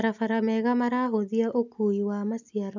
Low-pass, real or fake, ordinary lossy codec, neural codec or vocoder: 7.2 kHz; real; none; none